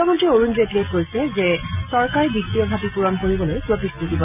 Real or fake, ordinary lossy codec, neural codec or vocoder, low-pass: real; none; none; 3.6 kHz